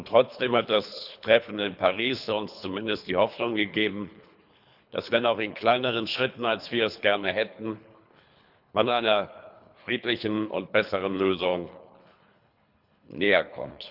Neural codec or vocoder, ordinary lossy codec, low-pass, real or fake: codec, 24 kHz, 3 kbps, HILCodec; none; 5.4 kHz; fake